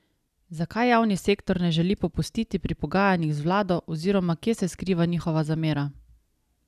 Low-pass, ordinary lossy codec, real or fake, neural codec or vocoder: 14.4 kHz; none; real; none